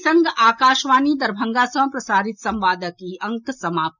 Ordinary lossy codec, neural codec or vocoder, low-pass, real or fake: none; none; 7.2 kHz; real